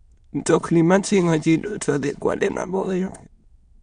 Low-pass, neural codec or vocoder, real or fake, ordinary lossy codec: 9.9 kHz; autoencoder, 22.05 kHz, a latent of 192 numbers a frame, VITS, trained on many speakers; fake; MP3, 64 kbps